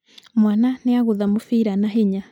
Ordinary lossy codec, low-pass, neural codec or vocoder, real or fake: none; 19.8 kHz; none; real